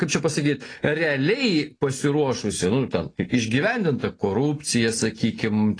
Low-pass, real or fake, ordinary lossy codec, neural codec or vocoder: 9.9 kHz; real; AAC, 32 kbps; none